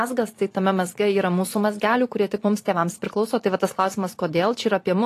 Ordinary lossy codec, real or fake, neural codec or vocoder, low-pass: AAC, 48 kbps; fake; vocoder, 44.1 kHz, 128 mel bands every 512 samples, BigVGAN v2; 14.4 kHz